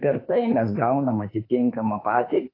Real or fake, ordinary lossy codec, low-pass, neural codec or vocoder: fake; AAC, 24 kbps; 5.4 kHz; autoencoder, 48 kHz, 32 numbers a frame, DAC-VAE, trained on Japanese speech